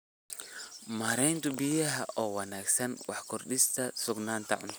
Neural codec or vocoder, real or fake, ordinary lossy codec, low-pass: none; real; none; none